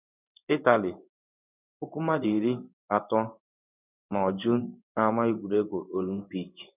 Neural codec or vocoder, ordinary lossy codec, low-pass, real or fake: codec, 16 kHz in and 24 kHz out, 1 kbps, XY-Tokenizer; none; 3.6 kHz; fake